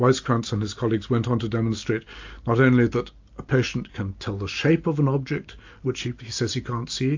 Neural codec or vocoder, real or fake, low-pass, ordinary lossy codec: none; real; 7.2 kHz; AAC, 48 kbps